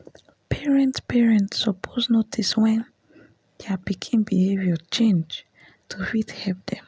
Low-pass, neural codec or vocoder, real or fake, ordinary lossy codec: none; none; real; none